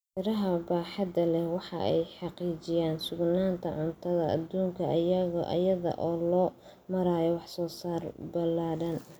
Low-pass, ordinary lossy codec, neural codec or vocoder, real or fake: none; none; none; real